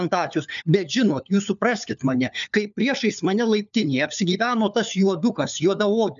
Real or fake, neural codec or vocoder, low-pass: fake; codec, 16 kHz, 4 kbps, FunCodec, trained on LibriTTS, 50 frames a second; 7.2 kHz